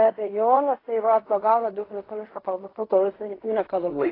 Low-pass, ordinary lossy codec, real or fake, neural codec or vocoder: 5.4 kHz; AAC, 24 kbps; fake; codec, 16 kHz in and 24 kHz out, 0.4 kbps, LongCat-Audio-Codec, fine tuned four codebook decoder